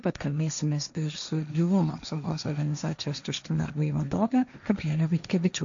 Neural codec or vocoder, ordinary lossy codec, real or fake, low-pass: codec, 16 kHz, 1.1 kbps, Voila-Tokenizer; MP3, 64 kbps; fake; 7.2 kHz